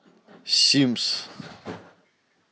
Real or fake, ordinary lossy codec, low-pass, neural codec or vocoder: real; none; none; none